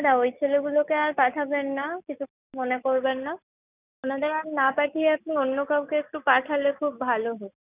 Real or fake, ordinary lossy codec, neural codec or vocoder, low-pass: real; none; none; 3.6 kHz